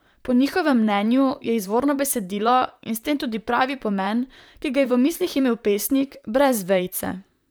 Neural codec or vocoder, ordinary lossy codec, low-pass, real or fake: vocoder, 44.1 kHz, 128 mel bands, Pupu-Vocoder; none; none; fake